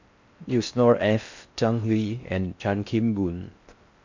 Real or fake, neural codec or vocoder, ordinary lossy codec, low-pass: fake; codec, 16 kHz in and 24 kHz out, 0.6 kbps, FocalCodec, streaming, 4096 codes; MP3, 64 kbps; 7.2 kHz